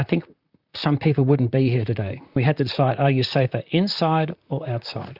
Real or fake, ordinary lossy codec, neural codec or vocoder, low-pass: fake; Opus, 64 kbps; vocoder, 44.1 kHz, 128 mel bands, Pupu-Vocoder; 5.4 kHz